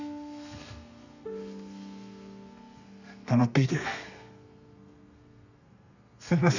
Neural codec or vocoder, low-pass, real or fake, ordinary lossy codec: codec, 32 kHz, 1.9 kbps, SNAC; 7.2 kHz; fake; none